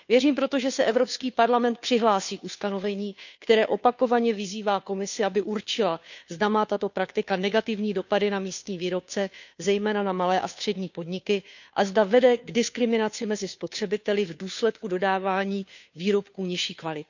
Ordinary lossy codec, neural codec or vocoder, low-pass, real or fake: none; codec, 16 kHz, 2 kbps, FunCodec, trained on Chinese and English, 25 frames a second; 7.2 kHz; fake